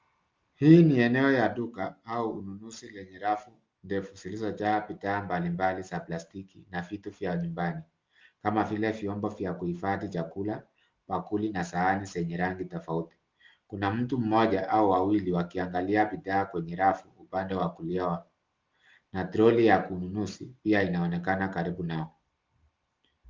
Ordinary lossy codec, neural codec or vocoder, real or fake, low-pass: Opus, 32 kbps; none; real; 7.2 kHz